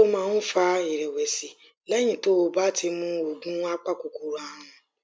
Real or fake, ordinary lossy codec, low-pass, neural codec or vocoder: real; none; none; none